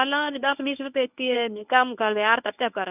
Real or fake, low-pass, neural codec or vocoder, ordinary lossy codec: fake; 3.6 kHz; codec, 24 kHz, 0.9 kbps, WavTokenizer, medium speech release version 1; none